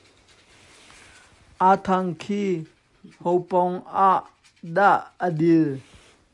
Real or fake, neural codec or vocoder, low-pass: real; none; 10.8 kHz